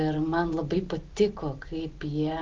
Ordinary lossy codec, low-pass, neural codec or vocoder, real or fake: Opus, 16 kbps; 7.2 kHz; none; real